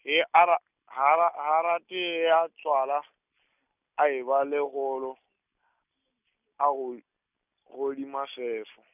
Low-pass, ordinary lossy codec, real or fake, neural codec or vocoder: 3.6 kHz; none; real; none